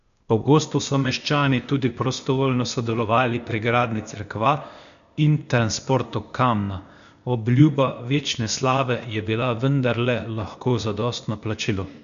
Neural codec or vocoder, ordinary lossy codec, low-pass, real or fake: codec, 16 kHz, 0.8 kbps, ZipCodec; MP3, 96 kbps; 7.2 kHz; fake